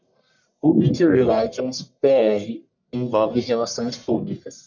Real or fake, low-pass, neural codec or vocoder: fake; 7.2 kHz; codec, 44.1 kHz, 1.7 kbps, Pupu-Codec